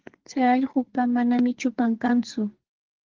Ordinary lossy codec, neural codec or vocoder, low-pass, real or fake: Opus, 16 kbps; codec, 44.1 kHz, 2.6 kbps, SNAC; 7.2 kHz; fake